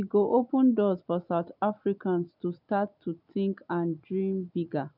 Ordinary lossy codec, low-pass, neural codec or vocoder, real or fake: none; 5.4 kHz; none; real